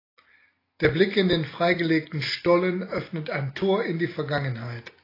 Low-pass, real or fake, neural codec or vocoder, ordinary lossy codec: 5.4 kHz; real; none; AAC, 24 kbps